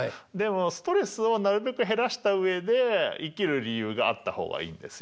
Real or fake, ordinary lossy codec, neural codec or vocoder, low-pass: real; none; none; none